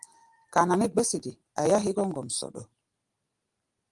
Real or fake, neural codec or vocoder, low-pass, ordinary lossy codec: real; none; 10.8 kHz; Opus, 24 kbps